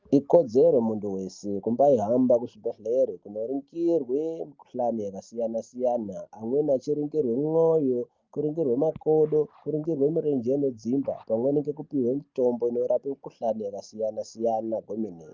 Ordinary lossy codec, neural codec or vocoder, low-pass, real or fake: Opus, 32 kbps; none; 7.2 kHz; real